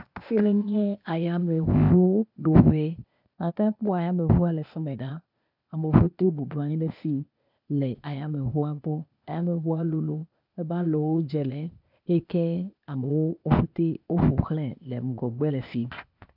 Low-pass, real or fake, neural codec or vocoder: 5.4 kHz; fake; codec, 16 kHz, 0.8 kbps, ZipCodec